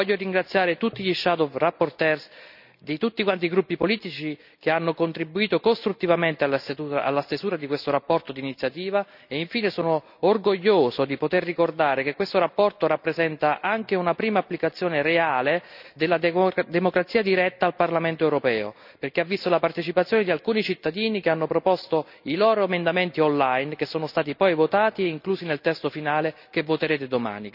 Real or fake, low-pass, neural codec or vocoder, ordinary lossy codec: real; 5.4 kHz; none; none